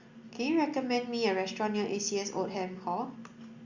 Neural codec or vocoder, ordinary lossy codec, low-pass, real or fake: none; Opus, 64 kbps; 7.2 kHz; real